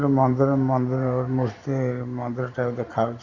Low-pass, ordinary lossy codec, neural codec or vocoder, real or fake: 7.2 kHz; none; vocoder, 44.1 kHz, 128 mel bands every 512 samples, BigVGAN v2; fake